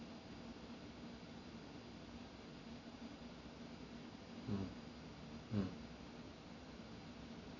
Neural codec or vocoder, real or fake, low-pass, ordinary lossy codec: none; real; 7.2 kHz; none